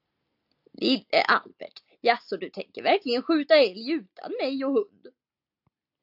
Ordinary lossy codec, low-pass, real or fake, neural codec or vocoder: AAC, 48 kbps; 5.4 kHz; real; none